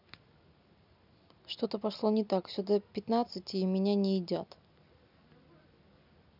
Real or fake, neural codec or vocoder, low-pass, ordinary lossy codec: real; none; 5.4 kHz; none